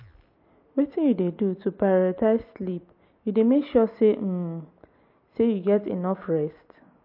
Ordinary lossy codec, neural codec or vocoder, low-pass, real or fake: MP3, 32 kbps; none; 5.4 kHz; real